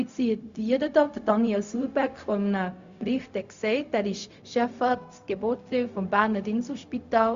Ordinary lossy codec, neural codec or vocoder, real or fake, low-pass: Opus, 64 kbps; codec, 16 kHz, 0.4 kbps, LongCat-Audio-Codec; fake; 7.2 kHz